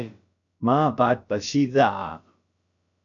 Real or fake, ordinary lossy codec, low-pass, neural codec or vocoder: fake; MP3, 96 kbps; 7.2 kHz; codec, 16 kHz, about 1 kbps, DyCAST, with the encoder's durations